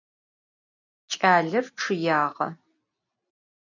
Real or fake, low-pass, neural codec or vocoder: real; 7.2 kHz; none